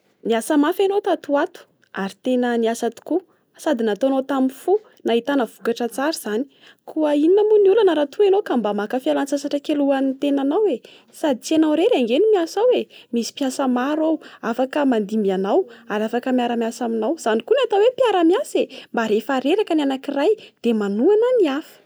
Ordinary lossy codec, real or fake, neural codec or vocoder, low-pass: none; real; none; none